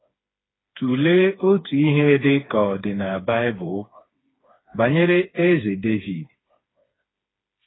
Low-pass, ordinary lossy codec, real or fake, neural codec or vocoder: 7.2 kHz; AAC, 16 kbps; fake; codec, 16 kHz, 4 kbps, FreqCodec, smaller model